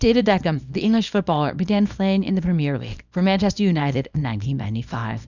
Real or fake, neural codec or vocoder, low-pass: fake; codec, 24 kHz, 0.9 kbps, WavTokenizer, small release; 7.2 kHz